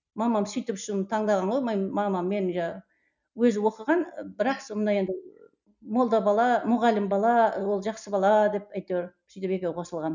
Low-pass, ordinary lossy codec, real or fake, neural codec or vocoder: 7.2 kHz; none; real; none